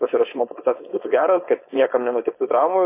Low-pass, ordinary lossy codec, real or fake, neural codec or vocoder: 3.6 kHz; MP3, 24 kbps; fake; codec, 16 kHz, 4.8 kbps, FACodec